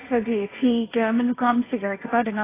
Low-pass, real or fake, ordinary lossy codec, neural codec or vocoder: 3.6 kHz; fake; AAC, 16 kbps; codec, 16 kHz, 1.1 kbps, Voila-Tokenizer